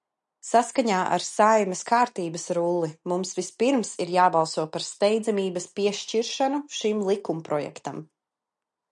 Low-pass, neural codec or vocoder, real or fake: 10.8 kHz; none; real